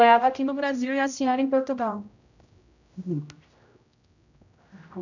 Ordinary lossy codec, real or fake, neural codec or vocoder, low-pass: none; fake; codec, 16 kHz, 0.5 kbps, X-Codec, HuBERT features, trained on general audio; 7.2 kHz